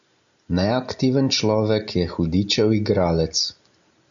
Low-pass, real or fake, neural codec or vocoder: 7.2 kHz; real; none